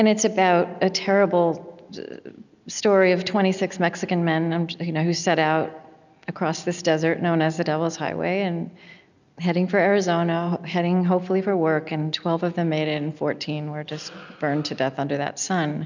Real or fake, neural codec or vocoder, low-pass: real; none; 7.2 kHz